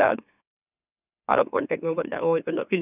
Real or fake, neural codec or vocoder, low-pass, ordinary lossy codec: fake; autoencoder, 44.1 kHz, a latent of 192 numbers a frame, MeloTTS; 3.6 kHz; none